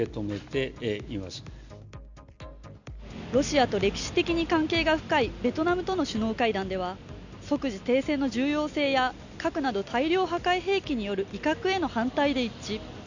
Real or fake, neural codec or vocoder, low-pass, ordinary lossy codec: real; none; 7.2 kHz; none